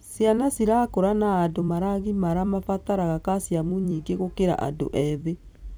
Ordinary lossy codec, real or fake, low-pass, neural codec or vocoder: none; fake; none; vocoder, 44.1 kHz, 128 mel bands every 256 samples, BigVGAN v2